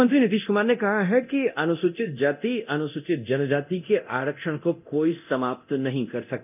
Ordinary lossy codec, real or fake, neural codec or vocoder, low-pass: none; fake; codec, 24 kHz, 0.9 kbps, DualCodec; 3.6 kHz